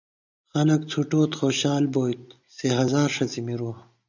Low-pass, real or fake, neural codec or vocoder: 7.2 kHz; real; none